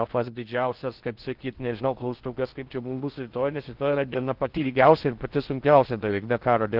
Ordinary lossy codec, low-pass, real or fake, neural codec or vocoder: Opus, 16 kbps; 5.4 kHz; fake; codec, 16 kHz in and 24 kHz out, 0.6 kbps, FocalCodec, streaming, 2048 codes